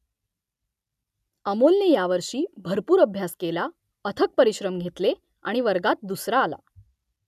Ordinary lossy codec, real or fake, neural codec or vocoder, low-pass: none; real; none; 14.4 kHz